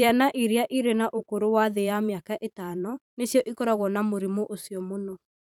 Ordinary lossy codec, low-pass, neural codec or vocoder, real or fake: none; 19.8 kHz; vocoder, 44.1 kHz, 128 mel bands every 512 samples, BigVGAN v2; fake